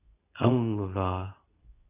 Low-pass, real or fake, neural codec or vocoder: 3.6 kHz; fake; codec, 24 kHz, 0.9 kbps, WavTokenizer, medium speech release version 2